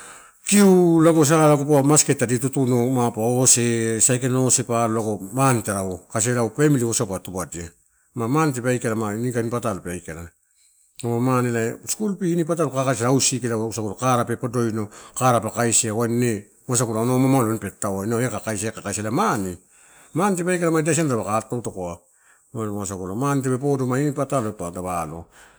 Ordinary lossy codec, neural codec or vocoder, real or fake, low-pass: none; none; real; none